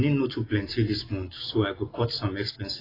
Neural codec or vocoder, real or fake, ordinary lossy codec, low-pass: none; real; AAC, 24 kbps; 5.4 kHz